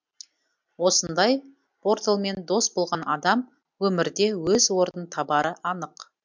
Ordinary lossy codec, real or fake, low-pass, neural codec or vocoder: MP3, 64 kbps; real; 7.2 kHz; none